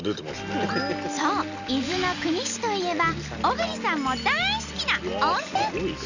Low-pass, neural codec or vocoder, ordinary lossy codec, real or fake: 7.2 kHz; none; none; real